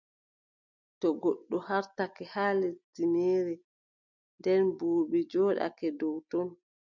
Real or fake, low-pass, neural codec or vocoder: real; 7.2 kHz; none